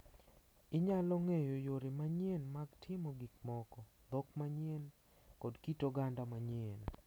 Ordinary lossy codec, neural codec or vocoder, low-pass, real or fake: none; none; none; real